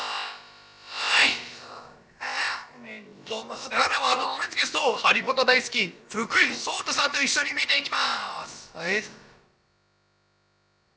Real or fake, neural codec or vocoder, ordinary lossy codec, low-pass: fake; codec, 16 kHz, about 1 kbps, DyCAST, with the encoder's durations; none; none